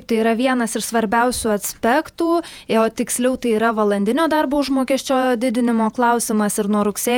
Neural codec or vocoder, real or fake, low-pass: vocoder, 48 kHz, 128 mel bands, Vocos; fake; 19.8 kHz